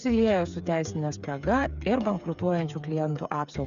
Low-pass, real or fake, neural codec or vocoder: 7.2 kHz; fake; codec, 16 kHz, 8 kbps, FreqCodec, smaller model